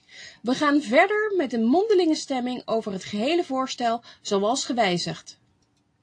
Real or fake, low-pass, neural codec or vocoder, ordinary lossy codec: real; 9.9 kHz; none; AAC, 48 kbps